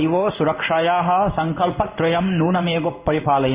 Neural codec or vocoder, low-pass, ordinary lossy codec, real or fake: codec, 16 kHz in and 24 kHz out, 1 kbps, XY-Tokenizer; 3.6 kHz; Opus, 64 kbps; fake